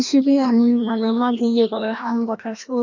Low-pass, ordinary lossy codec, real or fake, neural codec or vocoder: 7.2 kHz; none; fake; codec, 16 kHz, 1 kbps, FreqCodec, larger model